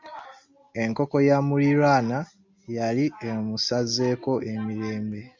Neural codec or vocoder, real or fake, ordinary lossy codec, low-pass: none; real; MP3, 64 kbps; 7.2 kHz